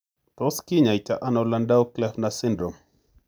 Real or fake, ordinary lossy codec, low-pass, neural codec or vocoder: real; none; none; none